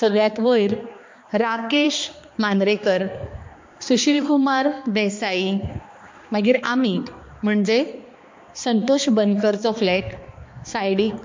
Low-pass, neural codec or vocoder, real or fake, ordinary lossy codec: 7.2 kHz; codec, 16 kHz, 2 kbps, X-Codec, HuBERT features, trained on balanced general audio; fake; MP3, 48 kbps